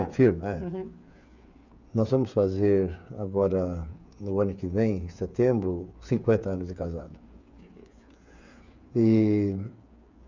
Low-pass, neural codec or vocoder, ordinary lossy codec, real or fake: 7.2 kHz; codec, 16 kHz, 8 kbps, FreqCodec, smaller model; none; fake